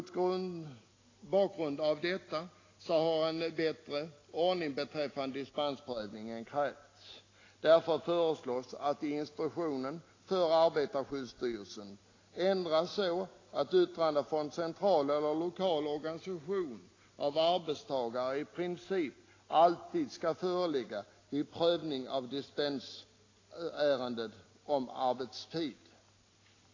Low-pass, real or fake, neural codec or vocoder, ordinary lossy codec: 7.2 kHz; real; none; AAC, 32 kbps